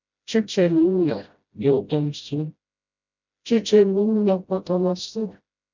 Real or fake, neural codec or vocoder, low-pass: fake; codec, 16 kHz, 0.5 kbps, FreqCodec, smaller model; 7.2 kHz